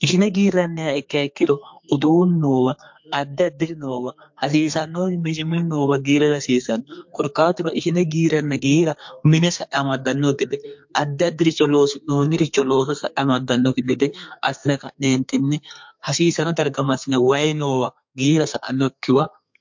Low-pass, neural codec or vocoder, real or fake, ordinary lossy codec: 7.2 kHz; codec, 32 kHz, 1.9 kbps, SNAC; fake; MP3, 48 kbps